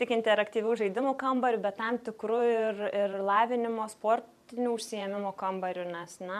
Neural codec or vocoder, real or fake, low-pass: vocoder, 44.1 kHz, 128 mel bands every 512 samples, BigVGAN v2; fake; 14.4 kHz